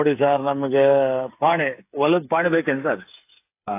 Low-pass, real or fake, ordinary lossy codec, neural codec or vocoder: 3.6 kHz; fake; AAC, 24 kbps; codec, 16 kHz, 8 kbps, FreqCodec, smaller model